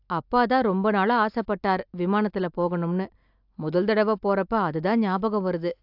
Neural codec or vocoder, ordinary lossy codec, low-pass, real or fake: none; AAC, 48 kbps; 5.4 kHz; real